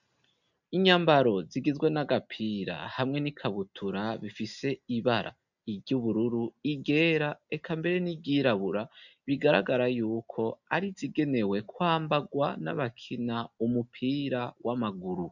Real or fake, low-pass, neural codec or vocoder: real; 7.2 kHz; none